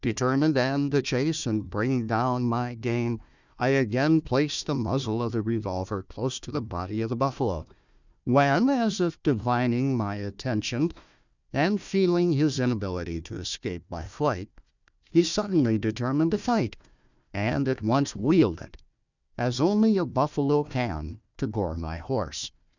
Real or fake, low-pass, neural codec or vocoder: fake; 7.2 kHz; codec, 16 kHz, 1 kbps, FunCodec, trained on Chinese and English, 50 frames a second